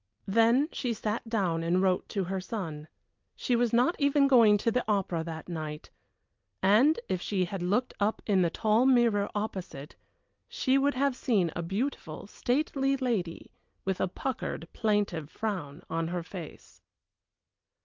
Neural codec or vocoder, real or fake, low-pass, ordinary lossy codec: none; real; 7.2 kHz; Opus, 24 kbps